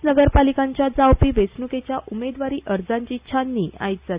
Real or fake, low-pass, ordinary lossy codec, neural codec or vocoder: real; 3.6 kHz; Opus, 64 kbps; none